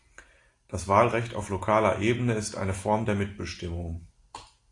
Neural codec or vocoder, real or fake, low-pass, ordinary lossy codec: none; real; 10.8 kHz; AAC, 48 kbps